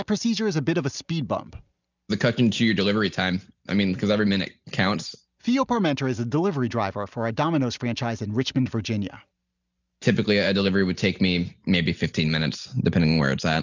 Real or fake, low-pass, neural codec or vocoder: real; 7.2 kHz; none